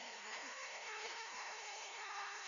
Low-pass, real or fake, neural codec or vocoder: 7.2 kHz; fake; codec, 16 kHz, 0.5 kbps, FunCodec, trained on LibriTTS, 25 frames a second